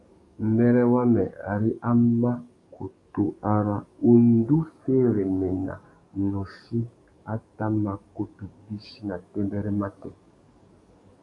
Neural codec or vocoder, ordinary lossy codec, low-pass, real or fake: codec, 44.1 kHz, 7.8 kbps, DAC; AAC, 48 kbps; 10.8 kHz; fake